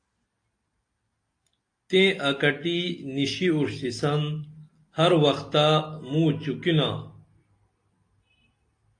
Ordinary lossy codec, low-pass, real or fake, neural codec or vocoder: AAC, 48 kbps; 9.9 kHz; real; none